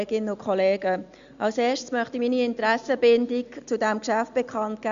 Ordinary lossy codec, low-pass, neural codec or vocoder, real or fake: Opus, 64 kbps; 7.2 kHz; none; real